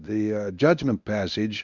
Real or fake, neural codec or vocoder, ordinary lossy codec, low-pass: fake; codec, 24 kHz, 0.9 kbps, WavTokenizer, medium speech release version 1; Opus, 64 kbps; 7.2 kHz